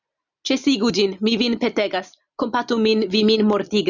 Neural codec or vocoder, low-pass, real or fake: none; 7.2 kHz; real